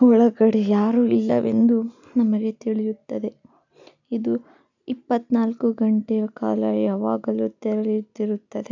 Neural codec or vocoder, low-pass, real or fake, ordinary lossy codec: none; 7.2 kHz; real; none